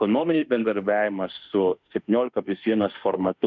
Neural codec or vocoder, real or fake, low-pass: autoencoder, 48 kHz, 32 numbers a frame, DAC-VAE, trained on Japanese speech; fake; 7.2 kHz